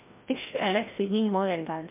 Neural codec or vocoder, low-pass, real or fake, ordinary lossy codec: codec, 16 kHz, 1 kbps, FreqCodec, larger model; 3.6 kHz; fake; MP3, 32 kbps